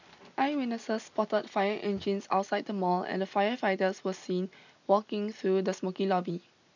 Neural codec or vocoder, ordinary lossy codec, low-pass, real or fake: none; none; 7.2 kHz; real